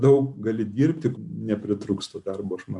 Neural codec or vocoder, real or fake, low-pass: none; real; 10.8 kHz